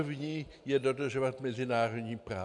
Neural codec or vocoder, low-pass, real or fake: none; 10.8 kHz; real